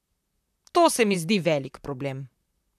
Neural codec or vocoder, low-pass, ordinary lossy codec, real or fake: vocoder, 44.1 kHz, 128 mel bands, Pupu-Vocoder; 14.4 kHz; none; fake